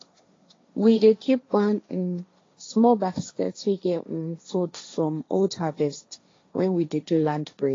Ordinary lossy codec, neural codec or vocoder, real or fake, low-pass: AAC, 32 kbps; codec, 16 kHz, 1.1 kbps, Voila-Tokenizer; fake; 7.2 kHz